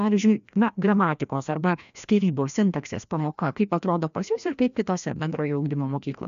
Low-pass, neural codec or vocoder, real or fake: 7.2 kHz; codec, 16 kHz, 1 kbps, FreqCodec, larger model; fake